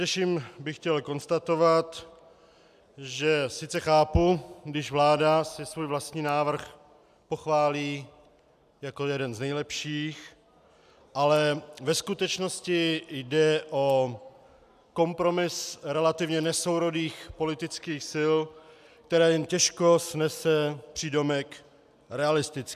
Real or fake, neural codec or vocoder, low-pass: real; none; 14.4 kHz